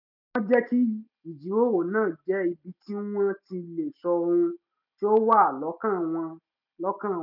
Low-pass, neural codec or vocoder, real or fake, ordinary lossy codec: 5.4 kHz; none; real; none